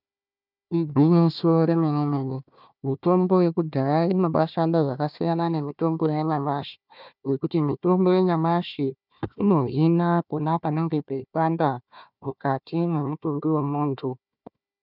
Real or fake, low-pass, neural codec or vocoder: fake; 5.4 kHz; codec, 16 kHz, 1 kbps, FunCodec, trained on Chinese and English, 50 frames a second